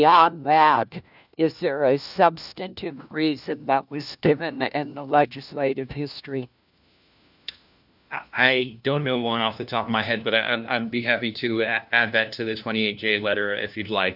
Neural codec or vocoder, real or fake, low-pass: codec, 16 kHz, 1 kbps, FunCodec, trained on LibriTTS, 50 frames a second; fake; 5.4 kHz